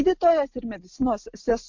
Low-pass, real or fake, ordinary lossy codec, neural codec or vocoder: 7.2 kHz; real; MP3, 48 kbps; none